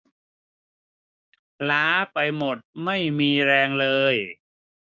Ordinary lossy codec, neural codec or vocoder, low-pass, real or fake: none; codec, 16 kHz, 6 kbps, DAC; none; fake